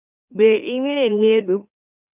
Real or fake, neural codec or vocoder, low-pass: fake; autoencoder, 44.1 kHz, a latent of 192 numbers a frame, MeloTTS; 3.6 kHz